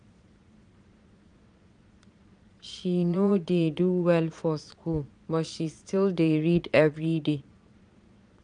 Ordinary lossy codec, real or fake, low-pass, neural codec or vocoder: none; fake; 9.9 kHz; vocoder, 22.05 kHz, 80 mel bands, Vocos